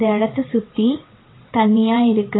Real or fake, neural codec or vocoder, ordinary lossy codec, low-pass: fake; vocoder, 22.05 kHz, 80 mel bands, WaveNeXt; AAC, 16 kbps; 7.2 kHz